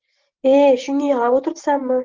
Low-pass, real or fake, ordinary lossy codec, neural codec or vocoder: 7.2 kHz; real; Opus, 16 kbps; none